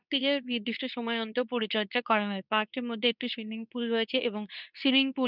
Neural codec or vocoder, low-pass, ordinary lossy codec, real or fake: codec, 24 kHz, 0.9 kbps, WavTokenizer, medium speech release version 2; 5.4 kHz; none; fake